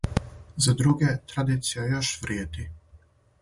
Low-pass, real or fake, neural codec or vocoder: 10.8 kHz; real; none